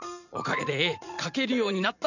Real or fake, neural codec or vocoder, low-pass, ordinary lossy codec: fake; vocoder, 44.1 kHz, 80 mel bands, Vocos; 7.2 kHz; none